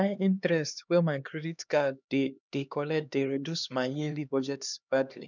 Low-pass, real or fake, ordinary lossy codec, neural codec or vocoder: 7.2 kHz; fake; none; codec, 16 kHz, 2 kbps, X-Codec, HuBERT features, trained on LibriSpeech